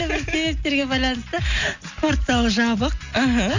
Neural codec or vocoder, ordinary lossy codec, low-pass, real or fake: none; none; 7.2 kHz; real